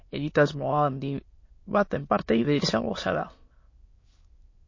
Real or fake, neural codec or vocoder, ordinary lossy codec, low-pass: fake; autoencoder, 22.05 kHz, a latent of 192 numbers a frame, VITS, trained on many speakers; MP3, 32 kbps; 7.2 kHz